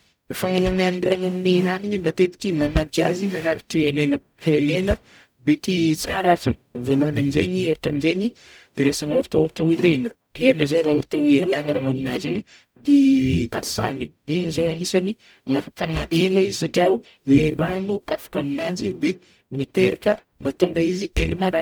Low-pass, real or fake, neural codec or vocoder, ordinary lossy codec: none; fake; codec, 44.1 kHz, 0.9 kbps, DAC; none